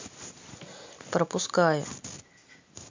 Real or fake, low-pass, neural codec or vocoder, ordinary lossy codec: real; 7.2 kHz; none; MP3, 64 kbps